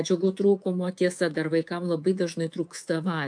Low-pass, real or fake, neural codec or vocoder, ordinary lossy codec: 9.9 kHz; fake; codec, 24 kHz, 3.1 kbps, DualCodec; AAC, 64 kbps